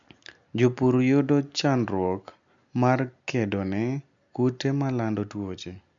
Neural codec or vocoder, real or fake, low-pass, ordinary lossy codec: none; real; 7.2 kHz; MP3, 64 kbps